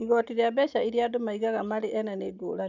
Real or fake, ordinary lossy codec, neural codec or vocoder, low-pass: real; none; none; 7.2 kHz